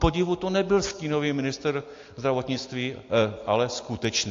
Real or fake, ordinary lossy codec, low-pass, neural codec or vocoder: real; AAC, 48 kbps; 7.2 kHz; none